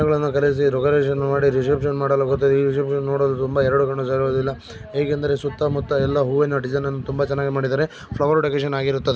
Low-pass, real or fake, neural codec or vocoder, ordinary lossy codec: none; real; none; none